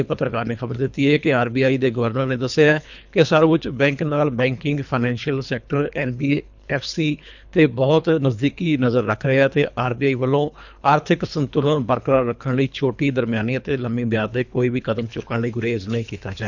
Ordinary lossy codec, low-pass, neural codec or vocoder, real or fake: none; 7.2 kHz; codec, 24 kHz, 3 kbps, HILCodec; fake